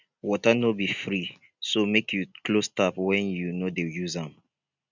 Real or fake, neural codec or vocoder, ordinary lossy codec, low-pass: real; none; none; 7.2 kHz